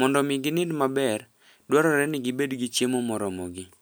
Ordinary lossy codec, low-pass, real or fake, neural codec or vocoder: none; none; real; none